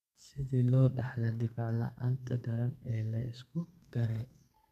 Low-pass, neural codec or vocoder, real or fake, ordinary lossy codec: 14.4 kHz; codec, 32 kHz, 1.9 kbps, SNAC; fake; none